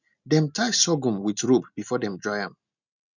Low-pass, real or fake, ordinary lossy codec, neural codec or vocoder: 7.2 kHz; real; none; none